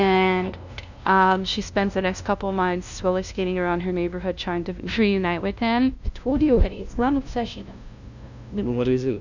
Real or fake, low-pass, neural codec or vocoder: fake; 7.2 kHz; codec, 16 kHz, 0.5 kbps, FunCodec, trained on LibriTTS, 25 frames a second